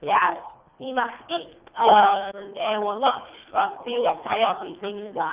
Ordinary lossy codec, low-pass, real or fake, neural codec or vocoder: Opus, 32 kbps; 3.6 kHz; fake; codec, 24 kHz, 1.5 kbps, HILCodec